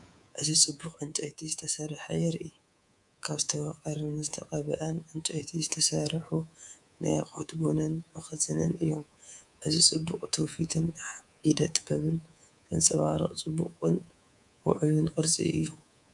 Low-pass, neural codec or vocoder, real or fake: 10.8 kHz; codec, 24 kHz, 3.1 kbps, DualCodec; fake